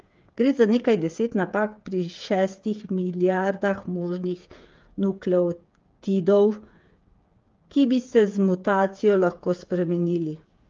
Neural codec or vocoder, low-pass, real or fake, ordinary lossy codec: codec, 16 kHz, 8 kbps, FreqCodec, smaller model; 7.2 kHz; fake; Opus, 24 kbps